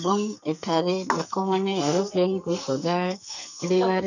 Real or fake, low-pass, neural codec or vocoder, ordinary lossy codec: fake; 7.2 kHz; codec, 44.1 kHz, 2.6 kbps, SNAC; none